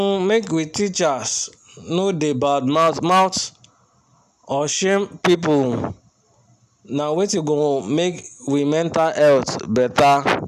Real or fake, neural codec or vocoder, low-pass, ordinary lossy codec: real; none; 14.4 kHz; none